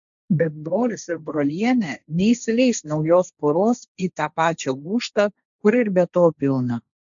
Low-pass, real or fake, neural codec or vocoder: 7.2 kHz; fake; codec, 16 kHz, 1.1 kbps, Voila-Tokenizer